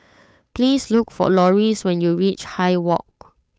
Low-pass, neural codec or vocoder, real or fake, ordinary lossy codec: none; codec, 16 kHz, 8 kbps, FunCodec, trained on Chinese and English, 25 frames a second; fake; none